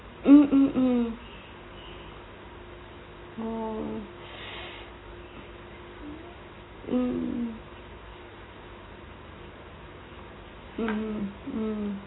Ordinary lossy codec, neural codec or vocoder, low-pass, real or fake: AAC, 16 kbps; none; 7.2 kHz; real